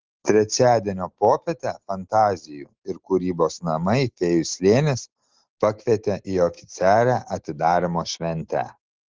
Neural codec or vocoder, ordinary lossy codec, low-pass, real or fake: none; Opus, 16 kbps; 7.2 kHz; real